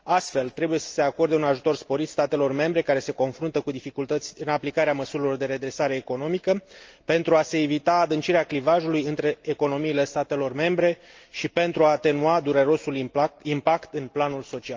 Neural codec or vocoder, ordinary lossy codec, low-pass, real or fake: none; Opus, 24 kbps; 7.2 kHz; real